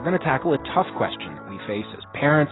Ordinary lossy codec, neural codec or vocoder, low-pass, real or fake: AAC, 16 kbps; none; 7.2 kHz; real